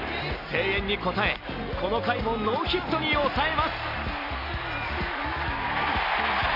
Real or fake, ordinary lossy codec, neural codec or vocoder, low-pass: real; none; none; 5.4 kHz